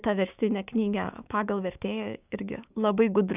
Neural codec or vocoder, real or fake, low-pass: codec, 16 kHz, 6 kbps, DAC; fake; 3.6 kHz